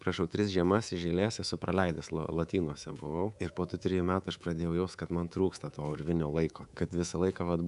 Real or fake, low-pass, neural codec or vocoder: fake; 10.8 kHz; codec, 24 kHz, 3.1 kbps, DualCodec